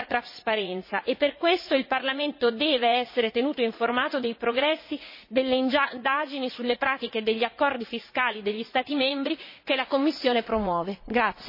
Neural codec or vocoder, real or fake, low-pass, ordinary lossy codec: vocoder, 44.1 kHz, 80 mel bands, Vocos; fake; 5.4 kHz; MP3, 24 kbps